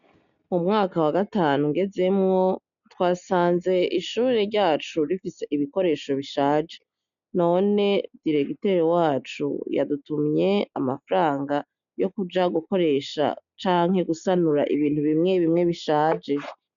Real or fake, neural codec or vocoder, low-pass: real; none; 7.2 kHz